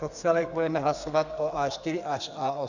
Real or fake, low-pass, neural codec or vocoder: fake; 7.2 kHz; codec, 32 kHz, 1.9 kbps, SNAC